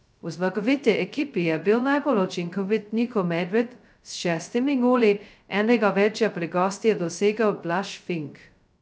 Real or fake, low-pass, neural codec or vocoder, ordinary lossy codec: fake; none; codec, 16 kHz, 0.2 kbps, FocalCodec; none